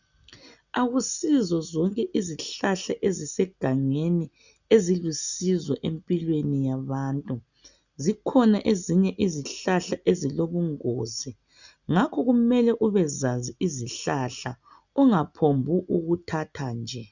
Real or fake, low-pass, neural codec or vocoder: real; 7.2 kHz; none